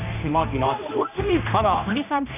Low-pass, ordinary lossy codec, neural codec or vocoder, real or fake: 3.6 kHz; none; codec, 16 kHz, 1 kbps, X-Codec, HuBERT features, trained on general audio; fake